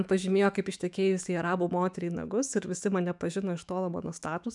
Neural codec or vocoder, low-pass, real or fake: autoencoder, 48 kHz, 128 numbers a frame, DAC-VAE, trained on Japanese speech; 10.8 kHz; fake